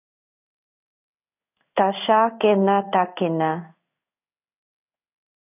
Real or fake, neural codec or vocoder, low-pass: fake; codec, 16 kHz in and 24 kHz out, 1 kbps, XY-Tokenizer; 3.6 kHz